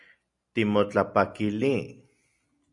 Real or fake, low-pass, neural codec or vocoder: real; 10.8 kHz; none